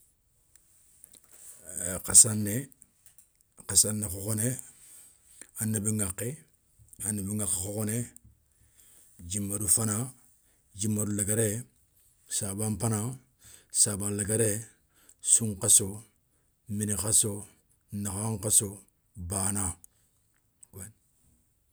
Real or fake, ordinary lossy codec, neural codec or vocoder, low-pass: real; none; none; none